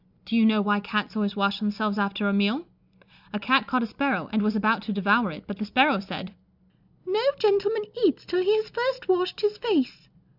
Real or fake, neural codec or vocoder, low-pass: real; none; 5.4 kHz